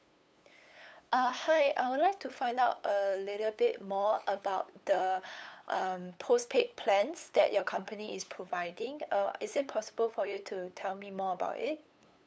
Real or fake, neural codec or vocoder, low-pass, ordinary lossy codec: fake; codec, 16 kHz, 8 kbps, FunCodec, trained on LibriTTS, 25 frames a second; none; none